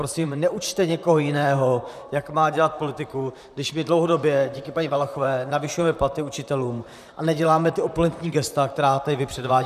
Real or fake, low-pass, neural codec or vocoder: fake; 14.4 kHz; vocoder, 44.1 kHz, 128 mel bands, Pupu-Vocoder